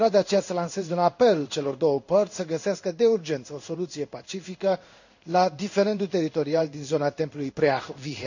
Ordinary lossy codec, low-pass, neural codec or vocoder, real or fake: none; 7.2 kHz; codec, 16 kHz in and 24 kHz out, 1 kbps, XY-Tokenizer; fake